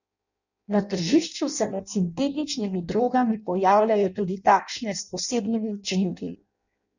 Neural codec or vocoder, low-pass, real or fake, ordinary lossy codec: codec, 16 kHz in and 24 kHz out, 0.6 kbps, FireRedTTS-2 codec; 7.2 kHz; fake; none